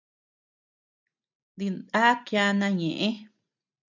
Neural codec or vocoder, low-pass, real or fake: none; 7.2 kHz; real